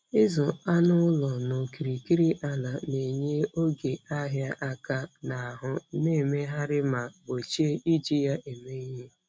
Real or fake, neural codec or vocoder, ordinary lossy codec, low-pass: real; none; none; none